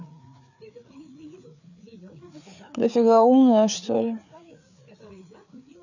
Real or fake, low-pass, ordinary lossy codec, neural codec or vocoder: fake; 7.2 kHz; none; codec, 16 kHz, 4 kbps, FreqCodec, larger model